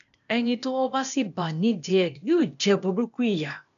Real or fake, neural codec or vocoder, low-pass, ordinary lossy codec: fake; codec, 16 kHz, 0.8 kbps, ZipCodec; 7.2 kHz; none